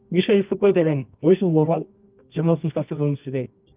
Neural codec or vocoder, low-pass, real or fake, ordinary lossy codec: codec, 24 kHz, 0.9 kbps, WavTokenizer, medium music audio release; 3.6 kHz; fake; Opus, 24 kbps